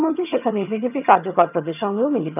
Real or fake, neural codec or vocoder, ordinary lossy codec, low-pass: fake; vocoder, 22.05 kHz, 80 mel bands, HiFi-GAN; none; 3.6 kHz